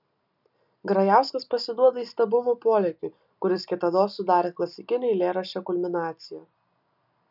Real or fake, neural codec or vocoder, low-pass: real; none; 5.4 kHz